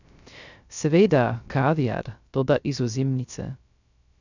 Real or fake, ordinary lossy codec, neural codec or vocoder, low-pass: fake; none; codec, 16 kHz, 0.3 kbps, FocalCodec; 7.2 kHz